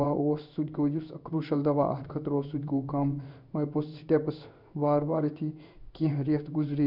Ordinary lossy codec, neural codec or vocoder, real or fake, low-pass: none; codec, 16 kHz in and 24 kHz out, 1 kbps, XY-Tokenizer; fake; 5.4 kHz